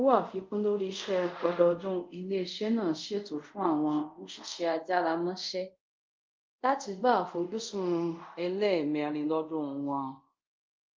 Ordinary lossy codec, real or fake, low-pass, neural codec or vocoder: Opus, 24 kbps; fake; 7.2 kHz; codec, 24 kHz, 0.5 kbps, DualCodec